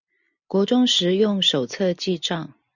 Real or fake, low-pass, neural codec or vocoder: real; 7.2 kHz; none